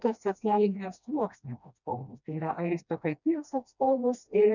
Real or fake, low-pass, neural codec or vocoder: fake; 7.2 kHz; codec, 16 kHz, 1 kbps, FreqCodec, smaller model